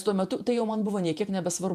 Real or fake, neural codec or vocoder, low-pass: fake; vocoder, 48 kHz, 128 mel bands, Vocos; 14.4 kHz